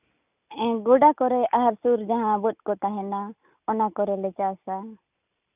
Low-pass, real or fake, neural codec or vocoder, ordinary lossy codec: 3.6 kHz; real; none; none